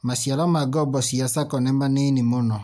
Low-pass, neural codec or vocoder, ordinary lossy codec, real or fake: none; none; none; real